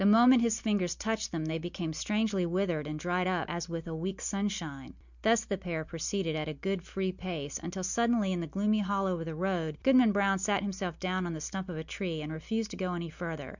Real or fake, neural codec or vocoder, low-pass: real; none; 7.2 kHz